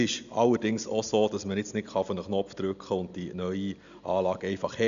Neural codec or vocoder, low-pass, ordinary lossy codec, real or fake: none; 7.2 kHz; none; real